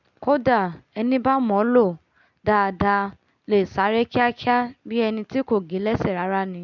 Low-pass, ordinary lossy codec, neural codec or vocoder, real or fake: 7.2 kHz; none; none; real